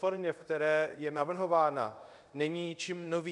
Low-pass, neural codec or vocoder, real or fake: 10.8 kHz; codec, 24 kHz, 0.5 kbps, DualCodec; fake